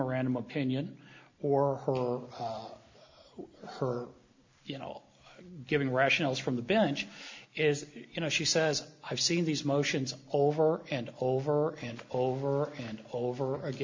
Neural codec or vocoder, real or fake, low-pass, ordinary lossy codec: none; real; 7.2 kHz; MP3, 64 kbps